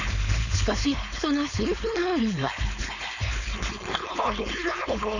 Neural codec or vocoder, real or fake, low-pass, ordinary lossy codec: codec, 16 kHz, 4.8 kbps, FACodec; fake; 7.2 kHz; none